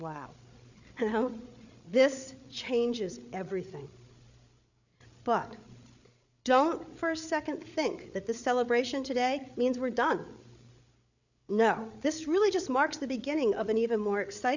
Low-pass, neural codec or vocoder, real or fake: 7.2 kHz; codec, 16 kHz, 8 kbps, FreqCodec, larger model; fake